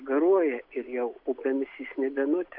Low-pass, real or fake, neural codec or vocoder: 5.4 kHz; real; none